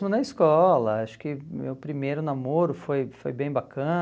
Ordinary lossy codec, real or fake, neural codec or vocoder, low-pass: none; real; none; none